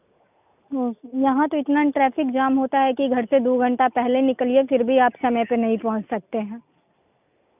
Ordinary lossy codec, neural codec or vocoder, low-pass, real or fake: none; none; 3.6 kHz; real